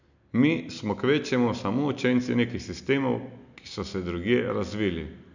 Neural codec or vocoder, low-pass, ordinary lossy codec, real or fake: none; 7.2 kHz; none; real